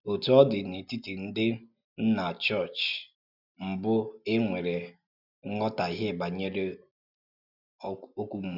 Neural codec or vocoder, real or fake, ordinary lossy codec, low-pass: none; real; none; 5.4 kHz